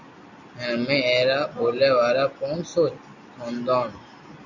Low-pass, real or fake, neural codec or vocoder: 7.2 kHz; real; none